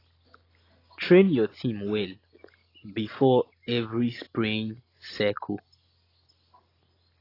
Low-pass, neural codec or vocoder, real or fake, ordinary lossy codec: 5.4 kHz; none; real; AAC, 24 kbps